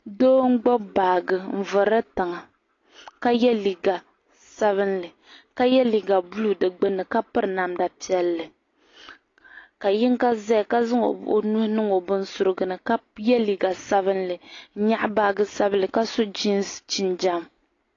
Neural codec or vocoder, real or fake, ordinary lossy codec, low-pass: none; real; AAC, 32 kbps; 7.2 kHz